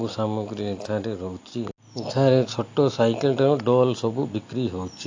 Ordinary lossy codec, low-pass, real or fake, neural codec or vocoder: AAC, 48 kbps; 7.2 kHz; real; none